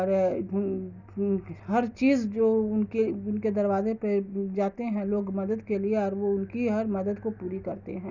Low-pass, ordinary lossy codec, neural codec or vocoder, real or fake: 7.2 kHz; none; none; real